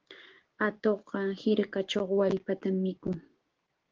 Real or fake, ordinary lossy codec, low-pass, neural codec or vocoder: fake; Opus, 16 kbps; 7.2 kHz; codec, 16 kHz, 6 kbps, DAC